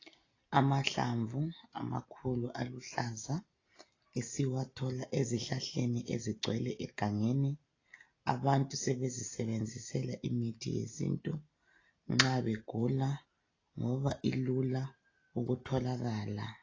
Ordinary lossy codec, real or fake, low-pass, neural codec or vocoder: AAC, 32 kbps; real; 7.2 kHz; none